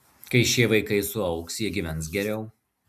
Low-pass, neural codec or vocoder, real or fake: 14.4 kHz; none; real